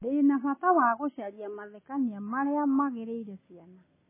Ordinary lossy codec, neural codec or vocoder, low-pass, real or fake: MP3, 16 kbps; none; 3.6 kHz; real